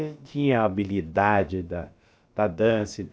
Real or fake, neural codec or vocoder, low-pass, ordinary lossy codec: fake; codec, 16 kHz, about 1 kbps, DyCAST, with the encoder's durations; none; none